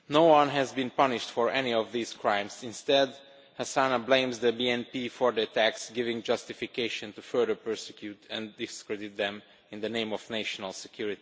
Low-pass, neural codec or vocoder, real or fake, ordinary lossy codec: none; none; real; none